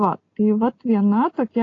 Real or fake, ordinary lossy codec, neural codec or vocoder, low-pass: real; AAC, 32 kbps; none; 7.2 kHz